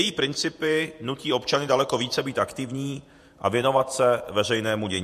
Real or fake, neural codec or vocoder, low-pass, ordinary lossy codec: fake; vocoder, 48 kHz, 128 mel bands, Vocos; 14.4 kHz; MP3, 64 kbps